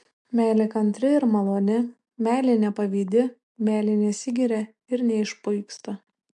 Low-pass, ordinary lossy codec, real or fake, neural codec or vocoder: 10.8 kHz; MP3, 64 kbps; real; none